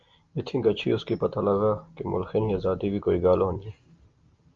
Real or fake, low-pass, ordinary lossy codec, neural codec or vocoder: real; 7.2 kHz; Opus, 32 kbps; none